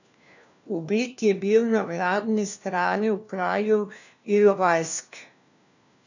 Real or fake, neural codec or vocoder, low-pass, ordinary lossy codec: fake; codec, 16 kHz, 1 kbps, FunCodec, trained on LibriTTS, 50 frames a second; 7.2 kHz; none